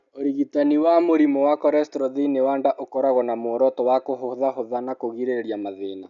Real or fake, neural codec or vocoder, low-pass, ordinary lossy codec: real; none; 7.2 kHz; none